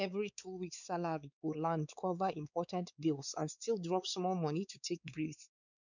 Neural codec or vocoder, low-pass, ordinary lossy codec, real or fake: codec, 16 kHz, 4 kbps, X-Codec, WavLM features, trained on Multilingual LibriSpeech; 7.2 kHz; none; fake